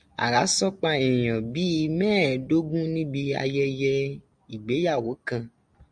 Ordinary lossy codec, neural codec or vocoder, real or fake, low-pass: MP3, 64 kbps; none; real; 9.9 kHz